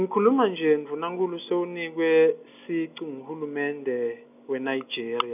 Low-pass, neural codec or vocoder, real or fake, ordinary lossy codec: 3.6 kHz; none; real; none